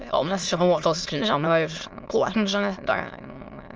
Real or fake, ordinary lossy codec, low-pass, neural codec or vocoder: fake; Opus, 24 kbps; 7.2 kHz; autoencoder, 22.05 kHz, a latent of 192 numbers a frame, VITS, trained on many speakers